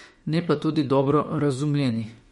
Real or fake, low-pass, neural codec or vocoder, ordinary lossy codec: fake; 19.8 kHz; autoencoder, 48 kHz, 32 numbers a frame, DAC-VAE, trained on Japanese speech; MP3, 48 kbps